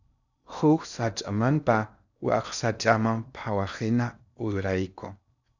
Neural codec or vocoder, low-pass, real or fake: codec, 16 kHz in and 24 kHz out, 0.6 kbps, FocalCodec, streaming, 4096 codes; 7.2 kHz; fake